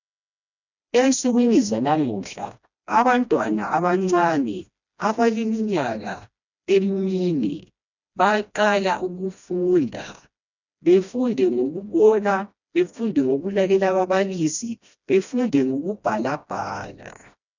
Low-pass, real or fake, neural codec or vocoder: 7.2 kHz; fake; codec, 16 kHz, 1 kbps, FreqCodec, smaller model